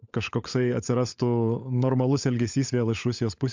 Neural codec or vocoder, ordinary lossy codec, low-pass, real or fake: codec, 16 kHz, 16 kbps, FunCodec, trained on LibriTTS, 50 frames a second; MP3, 64 kbps; 7.2 kHz; fake